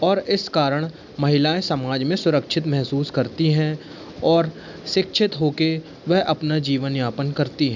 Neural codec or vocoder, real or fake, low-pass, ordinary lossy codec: none; real; 7.2 kHz; none